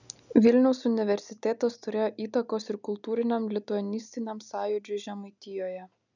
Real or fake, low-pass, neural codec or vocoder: real; 7.2 kHz; none